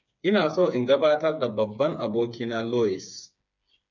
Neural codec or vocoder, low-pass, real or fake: codec, 16 kHz, 4 kbps, FreqCodec, smaller model; 7.2 kHz; fake